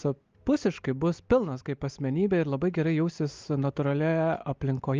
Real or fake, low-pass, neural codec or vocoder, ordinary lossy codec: real; 7.2 kHz; none; Opus, 24 kbps